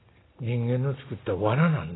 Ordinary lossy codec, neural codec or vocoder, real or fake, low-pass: AAC, 16 kbps; none; real; 7.2 kHz